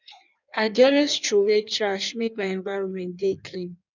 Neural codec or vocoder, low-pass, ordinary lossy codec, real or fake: codec, 16 kHz in and 24 kHz out, 1.1 kbps, FireRedTTS-2 codec; 7.2 kHz; none; fake